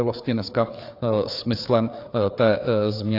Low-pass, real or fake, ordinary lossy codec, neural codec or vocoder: 5.4 kHz; fake; AAC, 32 kbps; codec, 16 kHz, 4 kbps, FreqCodec, larger model